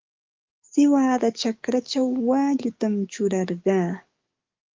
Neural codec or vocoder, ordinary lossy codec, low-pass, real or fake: codec, 44.1 kHz, 7.8 kbps, DAC; Opus, 24 kbps; 7.2 kHz; fake